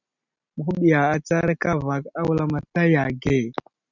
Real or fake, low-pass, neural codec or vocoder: real; 7.2 kHz; none